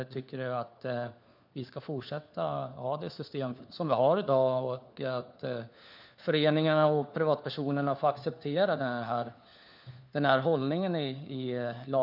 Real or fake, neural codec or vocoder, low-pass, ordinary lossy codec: fake; codec, 16 kHz, 4 kbps, FunCodec, trained on LibriTTS, 50 frames a second; 5.4 kHz; none